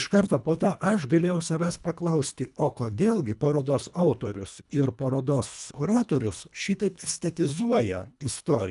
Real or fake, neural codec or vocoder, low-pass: fake; codec, 24 kHz, 1.5 kbps, HILCodec; 10.8 kHz